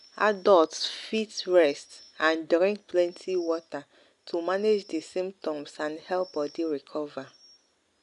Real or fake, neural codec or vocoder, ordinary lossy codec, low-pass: real; none; none; 10.8 kHz